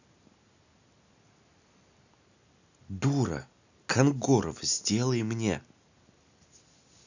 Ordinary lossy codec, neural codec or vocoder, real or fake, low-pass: none; none; real; 7.2 kHz